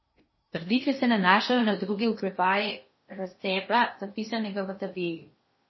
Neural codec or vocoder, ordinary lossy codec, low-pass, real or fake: codec, 16 kHz in and 24 kHz out, 0.8 kbps, FocalCodec, streaming, 65536 codes; MP3, 24 kbps; 7.2 kHz; fake